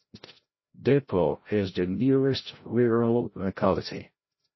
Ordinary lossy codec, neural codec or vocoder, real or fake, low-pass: MP3, 24 kbps; codec, 16 kHz, 0.5 kbps, FreqCodec, larger model; fake; 7.2 kHz